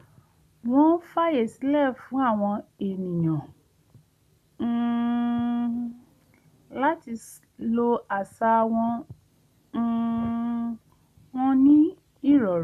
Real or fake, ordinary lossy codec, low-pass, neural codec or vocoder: real; none; 14.4 kHz; none